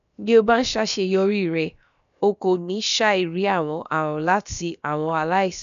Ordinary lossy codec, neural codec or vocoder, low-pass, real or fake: none; codec, 16 kHz, about 1 kbps, DyCAST, with the encoder's durations; 7.2 kHz; fake